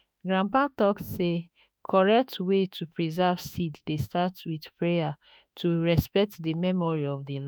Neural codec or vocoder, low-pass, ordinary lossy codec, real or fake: autoencoder, 48 kHz, 32 numbers a frame, DAC-VAE, trained on Japanese speech; none; none; fake